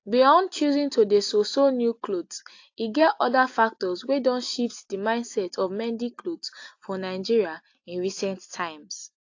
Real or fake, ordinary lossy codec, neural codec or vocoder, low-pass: real; AAC, 48 kbps; none; 7.2 kHz